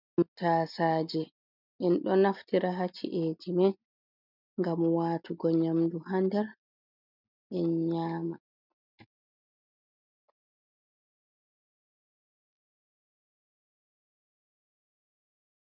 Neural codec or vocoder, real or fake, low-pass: none; real; 5.4 kHz